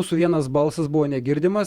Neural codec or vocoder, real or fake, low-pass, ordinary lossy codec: vocoder, 48 kHz, 128 mel bands, Vocos; fake; 19.8 kHz; Opus, 64 kbps